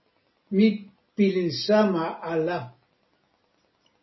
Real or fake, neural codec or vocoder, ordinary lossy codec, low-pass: real; none; MP3, 24 kbps; 7.2 kHz